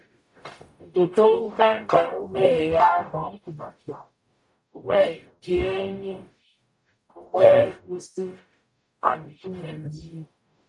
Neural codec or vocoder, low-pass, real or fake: codec, 44.1 kHz, 0.9 kbps, DAC; 10.8 kHz; fake